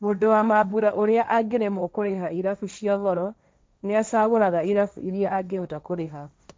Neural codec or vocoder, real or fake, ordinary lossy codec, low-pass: codec, 16 kHz, 1.1 kbps, Voila-Tokenizer; fake; none; none